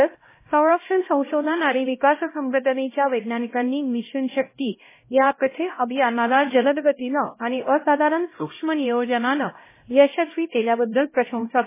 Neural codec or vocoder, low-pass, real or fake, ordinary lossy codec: codec, 16 kHz, 0.5 kbps, X-Codec, HuBERT features, trained on LibriSpeech; 3.6 kHz; fake; MP3, 16 kbps